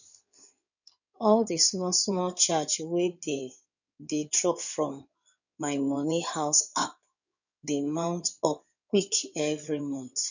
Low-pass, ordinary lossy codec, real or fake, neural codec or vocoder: 7.2 kHz; none; fake; codec, 16 kHz in and 24 kHz out, 2.2 kbps, FireRedTTS-2 codec